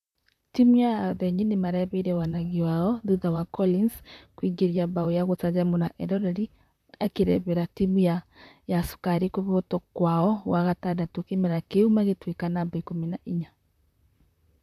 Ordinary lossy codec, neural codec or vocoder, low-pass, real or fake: none; vocoder, 44.1 kHz, 128 mel bands, Pupu-Vocoder; 14.4 kHz; fake